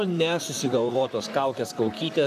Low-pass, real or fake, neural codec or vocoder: 14.4 kHz; fake; vocoder, 44.1 kHz, 128 mel bands, Pupu-Vocoder